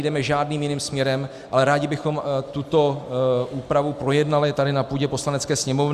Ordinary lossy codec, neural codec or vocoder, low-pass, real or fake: Opus, 64 kbps; none; 14.4 kHz; real